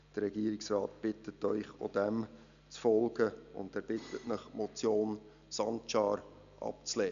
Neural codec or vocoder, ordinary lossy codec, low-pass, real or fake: none; none; 7.2 kHz; real